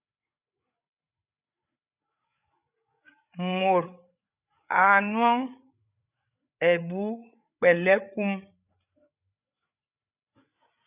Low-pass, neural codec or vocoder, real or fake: 3.6 kHz; codec, 16 kHz, 8 kbps, FreqCodec, larger model; fake